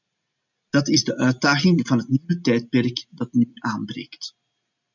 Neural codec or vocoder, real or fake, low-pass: none; real; 7.2 kHz